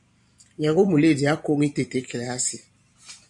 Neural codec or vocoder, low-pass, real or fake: vocoder, 44.1 kHz, 128 mel bands every 512 samples, BigVGAN v2; 10.8 kHz; fake